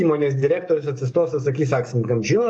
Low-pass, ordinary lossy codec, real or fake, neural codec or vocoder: 9.9 kHz; AAC, 64 kbps; fake; codec, 44.1 kHz, 7.8 kbps, DAC